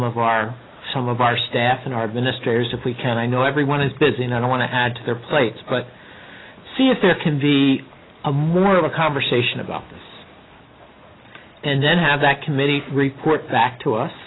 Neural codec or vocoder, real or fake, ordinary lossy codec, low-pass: none; real; AAC, 16 kbps; 7.2 kHz